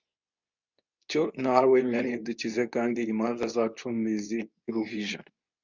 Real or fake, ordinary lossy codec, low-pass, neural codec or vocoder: fake; Opus, 64 kbps; 7.2 kHz; codec, 24 kHz, 0.9 kbps, WavTokenizer, medium speech release version 2